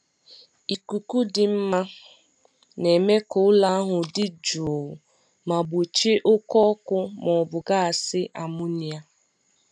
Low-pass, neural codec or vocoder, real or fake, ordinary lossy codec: 9.9 kHz; none; real; none